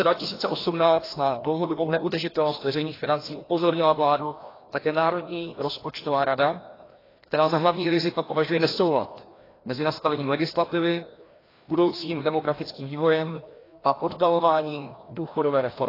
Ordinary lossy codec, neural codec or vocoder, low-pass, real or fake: AAC, 24 kbps; codec, 16 kHz, 1 kbps, FreqCodec, larger model; 5.4 kHz; fake